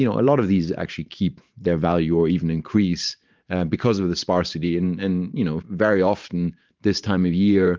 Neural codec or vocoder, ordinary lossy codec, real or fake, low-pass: vocoder, 44.1 kHz, 128 mel bands every 512 samples, BigVGAN v2; Opus, 24 kbps; fake; 7.2 kHz